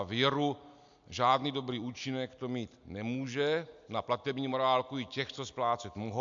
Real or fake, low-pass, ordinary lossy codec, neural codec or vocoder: real; 7.2 kHz; MP3, 64 kbps; none